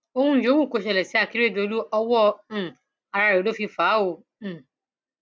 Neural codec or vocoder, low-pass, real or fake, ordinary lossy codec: none; none; real; none